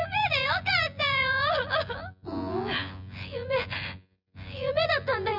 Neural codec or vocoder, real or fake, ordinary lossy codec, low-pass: vocoder, 24 kHz, 100 mel bands, Vocos; fake; none; 5.4 kHz